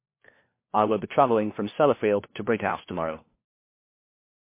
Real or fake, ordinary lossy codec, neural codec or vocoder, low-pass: fake; MP3, 24 kbps; codec, 16 kHz, 1 kbps, FunCodec, trained on LibriTTS, 50 frames a second; 3.6 kHz